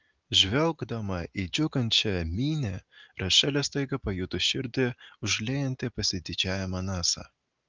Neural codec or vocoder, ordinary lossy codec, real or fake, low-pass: none; Opus, 32 kbps; real; 7.2 kHz